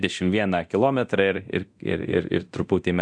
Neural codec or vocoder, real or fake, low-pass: codec, 24 kHz, 0.9 kbps, DualCodec; fake; 9.9 kHz